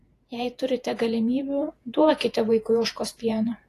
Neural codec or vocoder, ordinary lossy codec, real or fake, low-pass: vocoder, 48 kHz, 128 mel bands, Vocos; AAC, 48 kbps; fake; 14.4 kHz